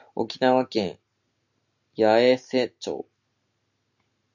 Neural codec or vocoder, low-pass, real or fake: none; 7.2 kHz; real